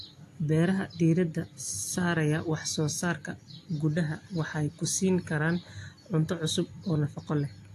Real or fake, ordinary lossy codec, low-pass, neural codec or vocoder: real; AAC, 64 kbps; 14.4 kHz; none